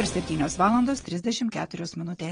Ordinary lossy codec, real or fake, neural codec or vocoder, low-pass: AAC, 32 kbps; fake; vocoder, 22.05 kHz, 80 mel bands, WaveNeXt; 9.9 kHz